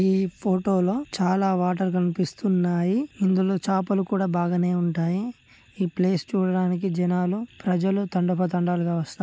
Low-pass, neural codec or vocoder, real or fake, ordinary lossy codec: none; none; real; none